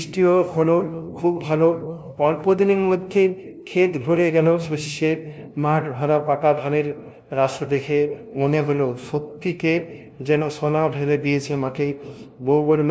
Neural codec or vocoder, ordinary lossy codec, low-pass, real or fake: codec, 16 kHz, 0.5 kbps, FunCodec, trained on LibriTTS, 25 frames a second; none; none; fake